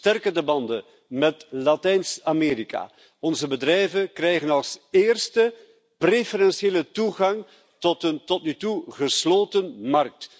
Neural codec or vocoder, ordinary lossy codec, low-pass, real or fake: none; none; none; real